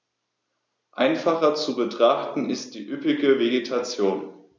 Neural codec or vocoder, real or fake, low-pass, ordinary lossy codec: none; real; none; none